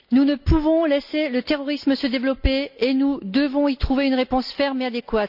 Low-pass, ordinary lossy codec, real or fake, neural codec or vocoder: 5.4 kHz; none; real; none